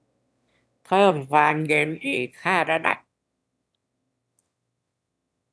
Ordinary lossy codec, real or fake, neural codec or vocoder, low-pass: none; fake; autoencoder, 22.05 kHz, a latent of 192 numbers a frame, VITS, trained on one speaker; none